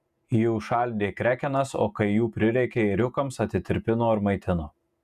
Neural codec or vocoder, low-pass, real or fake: vocoder, 48 kHz, 128 mel bands, Vocos; 14.4 kHz; fake